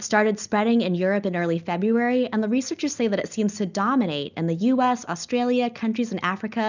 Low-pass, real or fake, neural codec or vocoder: 7.2 kHz; real; none